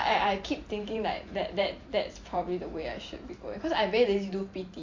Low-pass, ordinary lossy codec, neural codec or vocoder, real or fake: 7.2 kHz; AAC, 48 kbps; vocoder, 44.1 kHz, 128 mel bands every 512 samples, BigVGAN v2; fake